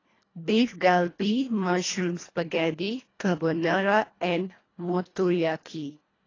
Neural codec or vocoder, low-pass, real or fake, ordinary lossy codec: codec, 24 kHz, 1.5 kbps, HILCodec; 7.2 kHz; fake; AAC, 32 kbps